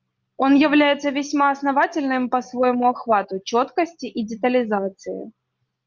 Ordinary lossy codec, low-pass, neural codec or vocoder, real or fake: Opus, 24 kbps; 7.2 kHz; none; real